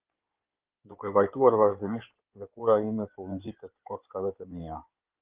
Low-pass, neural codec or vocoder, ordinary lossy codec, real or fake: 3.6 kHz; codec, 16 kHz in and 24 kHz out, 2.2 kbps, FireRedTTS-2 codec; Opus, 32 kbps; fake